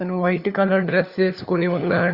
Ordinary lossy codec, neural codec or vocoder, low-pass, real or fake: Opus, 64 kbps; codec, 16 kHz, 4 kbps, FunCodec, trained on LibriTTS, 50 frames a second; 5.4 kHz; fake